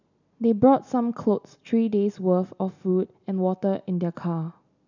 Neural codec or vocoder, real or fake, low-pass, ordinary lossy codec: none; real; 7.2 kHz; none